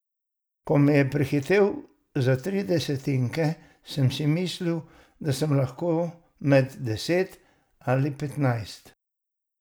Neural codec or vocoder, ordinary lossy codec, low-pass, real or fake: none; none; none; real